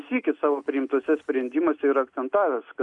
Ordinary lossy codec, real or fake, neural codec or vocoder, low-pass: AAC, 64 kbps; real; none; 10.8 kHz